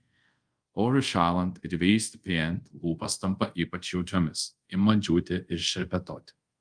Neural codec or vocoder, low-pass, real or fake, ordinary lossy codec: codec, 24 kHz, 0.5 kbps, DualCodec; 9.9 kHz; fake; Opus, 32 kbps